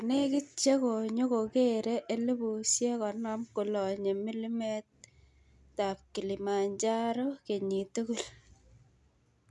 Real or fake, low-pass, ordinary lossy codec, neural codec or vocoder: real; none; none; none